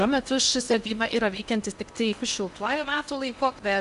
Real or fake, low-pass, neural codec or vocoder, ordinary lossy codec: fake; 10.8 kHz; codec, 16 kHz in and 24 kHz out, 0.8 kbps, FocalCodec, streaming, 65536 codes; Opus, 64 kbps